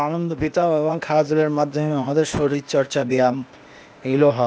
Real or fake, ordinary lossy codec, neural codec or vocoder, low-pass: fake; none; codec, 16 kHz, 0.8 kbps, ZipCodec; none